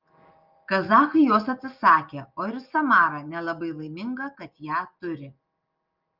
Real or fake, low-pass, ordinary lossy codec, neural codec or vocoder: real; 5.4 kHz; Opus, 24 kbps; none